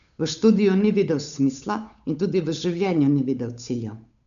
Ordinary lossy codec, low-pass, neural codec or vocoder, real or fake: none; 7.2 kHz; codec, 16 kHz, 8 kbps, FunCodec, trained on Chinese and English, 25 frames a second; fake